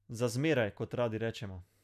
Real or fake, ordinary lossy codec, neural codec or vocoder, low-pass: real; none; none; 14.4 kHz